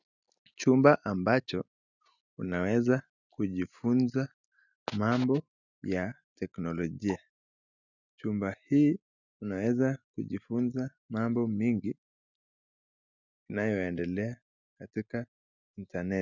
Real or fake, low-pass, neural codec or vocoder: real; 7.2 kHz; none